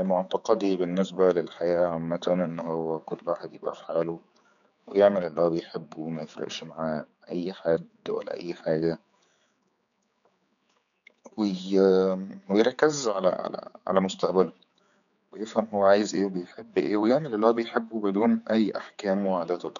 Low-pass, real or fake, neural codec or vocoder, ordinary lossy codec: 7.2 kHz; fake; codec, 16 kHz, 4 kbps, X-Codec, HuBERT features, trained on general audio; none